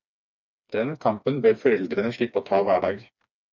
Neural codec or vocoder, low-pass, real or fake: codec, 16 kHz, 2 kbps, FreqCodec, smaller model; 7.2 kHz; fake